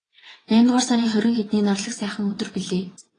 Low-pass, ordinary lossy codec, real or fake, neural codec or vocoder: 9.9 kHz; AAC, 32 kbps; fake; vocoder, 22.05 kHz, 80 mel bands, WaveNeXt